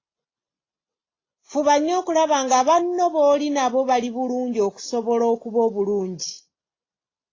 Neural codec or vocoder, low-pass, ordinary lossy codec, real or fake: none; 7.2 kHz; AAC, 32 kbps; real